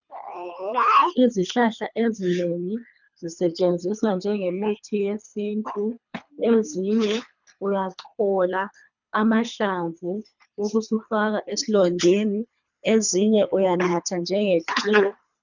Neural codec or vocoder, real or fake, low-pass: codec, 24 kHz, 3 kbps, HILCodec; fake; 7.2 kHz